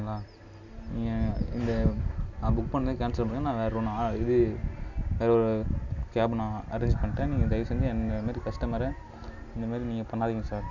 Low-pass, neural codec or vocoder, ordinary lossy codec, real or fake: 7.2 kHz; none; none; real